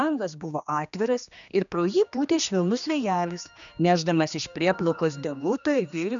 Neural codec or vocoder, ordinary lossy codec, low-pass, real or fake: codec, 16 kHz, 2 kbps, X-Codec, HuBERT features, trained on general audio; MP3, 96 kbps; 7.2 kHz; fake